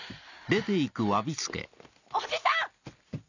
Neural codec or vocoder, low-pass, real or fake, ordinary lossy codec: none; 7.2 kHz; real; none